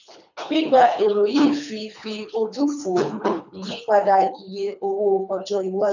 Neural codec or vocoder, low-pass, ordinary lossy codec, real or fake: codec, 24 kHz, 3 kbps, HILCodec; 7.2 kHz; none; fake